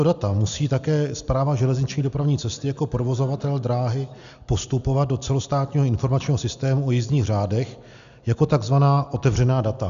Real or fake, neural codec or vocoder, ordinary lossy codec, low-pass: real; none; AAC, 96 kbps; 7.2 kHz